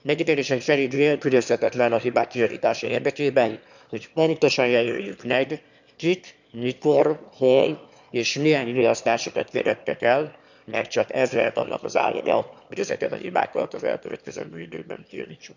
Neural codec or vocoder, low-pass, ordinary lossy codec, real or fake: autoencoder, 22.05 kHz, a latent of 192 numbers a frame, VITS, trained on one speaker; 7.2 kHz; none; fake